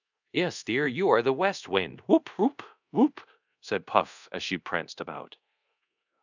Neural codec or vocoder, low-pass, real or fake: codec, 24 kHz, 0.5 kbps, DualCodec; 7.2 kHz; fake